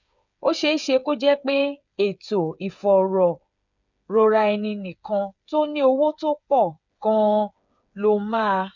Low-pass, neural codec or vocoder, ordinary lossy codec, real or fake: 7.2 kHz; codec, 16 kHz, 8 kbps, FreqCodec, smaller model; none; fake